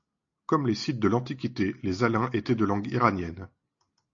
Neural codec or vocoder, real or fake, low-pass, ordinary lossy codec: none; real; 7.2 kHz; AAC, 48 kbps